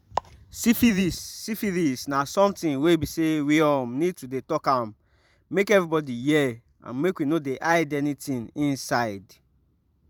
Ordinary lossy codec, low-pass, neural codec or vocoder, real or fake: none; 19.8 kHz; vocoder, 44.1 kHz, 128 mel bands every 512 samples, BigVGAN v2; fake